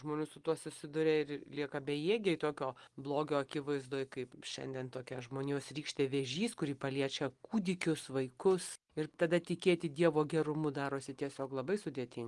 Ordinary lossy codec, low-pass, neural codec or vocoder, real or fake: Opus, 24 kbps; 10.8 kHz; none; real